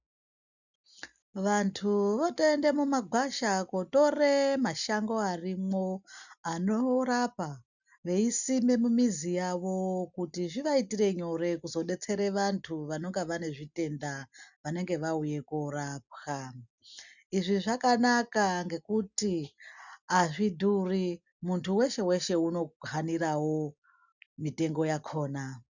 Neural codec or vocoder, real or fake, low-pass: none; real; 7.2 kHz